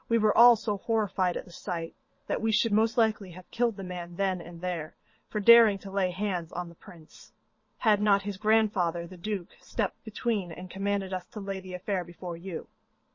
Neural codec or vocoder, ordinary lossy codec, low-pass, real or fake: vocoder, 22.05 kHz, 80 mel bands, Vocos; MP3, 32 kbps; 7.2 kHz; fake